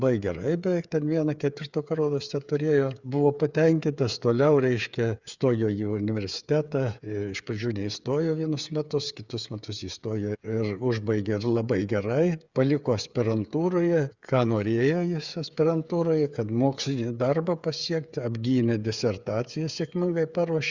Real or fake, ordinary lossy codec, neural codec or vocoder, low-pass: fake; Opus, 64 kbps; codec, 16 kHz, 8 kbps, FreqCodec, smaller model; 7.2 kHz